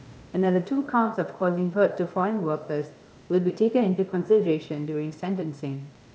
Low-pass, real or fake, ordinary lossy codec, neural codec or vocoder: none; fake; none; codec, 16 kHz, 0.8 kbps, ZipCodec